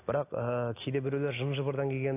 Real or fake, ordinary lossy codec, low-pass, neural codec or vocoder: real; AAC, 24 kbps; 3.6 kHz; none